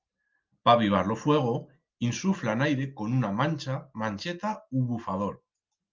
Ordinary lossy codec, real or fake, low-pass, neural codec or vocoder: Opus, 32 kbps; real; 7.2 kHz; none